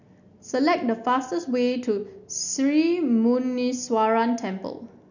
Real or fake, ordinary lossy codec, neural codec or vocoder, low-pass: real; none; none; 7.2 kHz